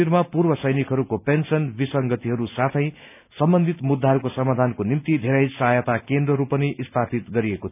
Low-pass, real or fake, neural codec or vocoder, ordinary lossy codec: 3.6 kHz; real; none; none